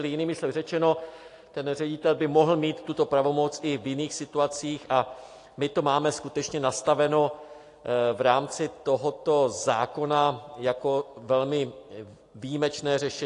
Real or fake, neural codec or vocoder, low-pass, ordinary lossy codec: real; none; 10.8 kHz; AAC, 48 kbps